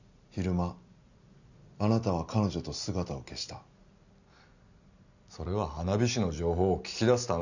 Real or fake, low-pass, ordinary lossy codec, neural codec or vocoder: real; 7.2 kHz; none; none